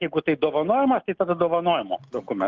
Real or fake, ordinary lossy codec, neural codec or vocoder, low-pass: real; Opus, 24 kbps; none; 7.2 kHz